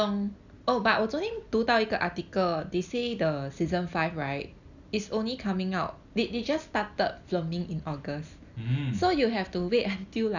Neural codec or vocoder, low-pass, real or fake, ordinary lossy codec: none; 7.2 kHz; real; none